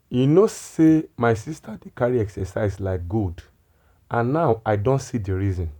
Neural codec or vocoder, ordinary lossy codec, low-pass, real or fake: none; none; 19.8 kHz; real